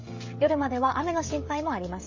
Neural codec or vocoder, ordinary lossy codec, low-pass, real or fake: codec, 16 kHz, 16 kbps, FreqCodec, smaller model; MP3, 32 kbps; 7.2 kHz; fake